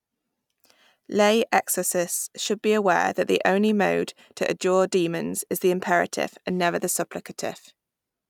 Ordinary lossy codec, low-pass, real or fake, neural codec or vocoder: none; 19.8 kHz; real; none